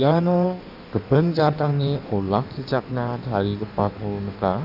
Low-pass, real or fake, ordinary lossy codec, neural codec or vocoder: 5.4 kHz; fake; none; codec, 16 kHz in and 24 kHz out, 1.1 kbps, FireRedTTS-2 codec